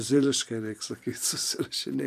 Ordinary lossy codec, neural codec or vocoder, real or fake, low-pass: AAC, 96 kbps; vocoder, 44.1 kHz, 128 mel bands every 512 samples, BigVGAN v2; fake; 14.4 kHz